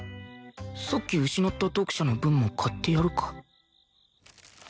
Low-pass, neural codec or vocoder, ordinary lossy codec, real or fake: none; none; none; real